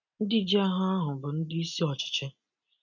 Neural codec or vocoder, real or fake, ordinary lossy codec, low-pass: none; real; none; 7.2 kHz